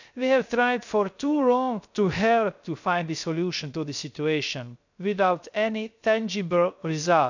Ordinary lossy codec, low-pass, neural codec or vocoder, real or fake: none; 7.2 kHz; codec, 16 kHz, 0.3 kbps, FocalCodec; fake